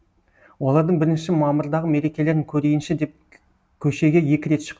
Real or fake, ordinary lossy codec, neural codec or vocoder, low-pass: real; none; none; none